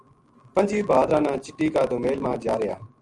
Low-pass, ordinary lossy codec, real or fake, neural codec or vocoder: 10.8 kHz; Opus, 32 kbps; real; none